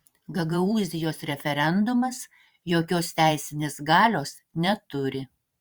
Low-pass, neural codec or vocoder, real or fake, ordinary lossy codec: 19.8 kHz; vocoder, 44.1 kHz, 128 mel bands every 512 samples, BigVGAN v2; fake; Opus, 64 kbps